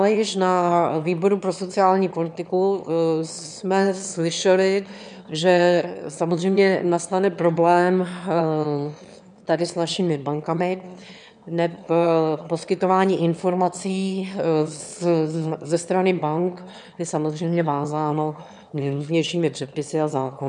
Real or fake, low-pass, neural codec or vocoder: fake; 9.9 kHz; autoencoder, 22.05 kHz, a latent of 192 numbers a frame, VITS, trained on one speaker